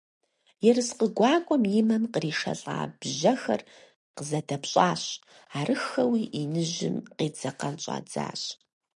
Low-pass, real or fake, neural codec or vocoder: 10.8 kHz; real; none